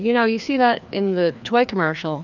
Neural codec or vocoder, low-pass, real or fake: codec, 16 kHz, 2 kbps, FreqCodec, larger model; 7.2 kHz; fake